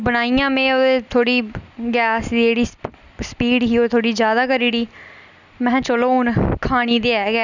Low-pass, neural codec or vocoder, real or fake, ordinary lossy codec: 7.2 kHz; none; real; none